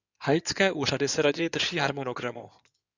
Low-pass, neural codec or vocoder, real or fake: 7.2 kHz; codec, 16 kHz in and 24 kHz out, 2.2 kbps, FireRedTTS-2 codec; fake